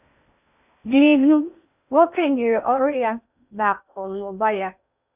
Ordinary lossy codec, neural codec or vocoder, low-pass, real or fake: none; codec, 16 kHz in and 24 kHz out, 0.6 kbps, FocalCodec, streaming, 2048 codes; 3.6 kHz; fake